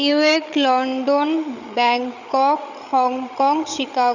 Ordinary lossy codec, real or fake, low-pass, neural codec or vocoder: none; fake; 7.2 kHz; codec, 16 kHz, 16 kbps, FreqCodec, larger model